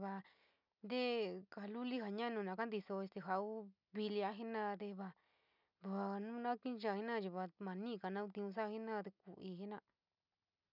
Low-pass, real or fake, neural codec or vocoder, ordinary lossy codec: 5.4 kHz; real; none; none